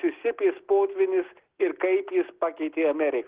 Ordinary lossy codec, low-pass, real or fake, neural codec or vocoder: Opus, 16 kbps; 3.6 kHz; real; none